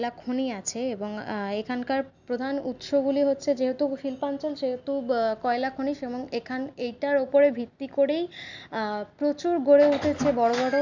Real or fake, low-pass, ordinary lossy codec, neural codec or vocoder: real; 7.2 kHz; none; none